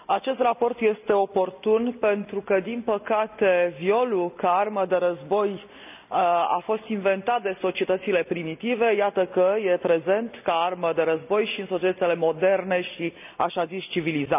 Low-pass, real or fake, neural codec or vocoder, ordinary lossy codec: 3.6 kHz; real; none; none